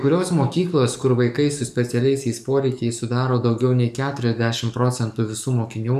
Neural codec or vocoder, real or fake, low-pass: codec, 44.1 kHz, 7.8 kbps, DAC; fake; 14.4 kHz